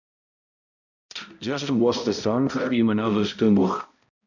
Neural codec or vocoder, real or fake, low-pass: codec, 16 kHz, 0.5 kbps, X-Codec, HuBERT features, trained on balanced general audio; fake; 7.2 kHz